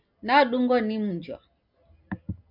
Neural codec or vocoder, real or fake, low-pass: none; real; 5.4 kHz